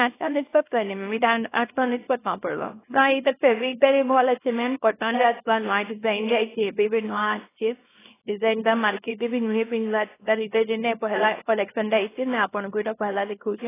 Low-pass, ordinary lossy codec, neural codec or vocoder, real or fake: 3.6 kHz; AAC, 16 kbps; codec, 24 kHz, 0.9 kbps, WavTokenizer, small release; fake